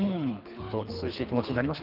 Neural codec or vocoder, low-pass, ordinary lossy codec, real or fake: codec, 24 kHz, 3 kbps, HILCodec; 5.4 kHz; Opus, 32 kbps; fake